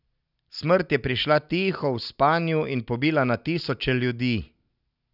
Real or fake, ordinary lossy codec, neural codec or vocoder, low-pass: real; none; none; 5.4 kHz